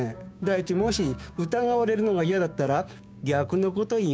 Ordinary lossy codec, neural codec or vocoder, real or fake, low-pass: none; codec, 16 kHz, 6 kbps, DAC; fake; none